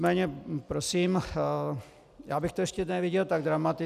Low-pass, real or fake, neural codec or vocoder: 14.4 kHz; real; none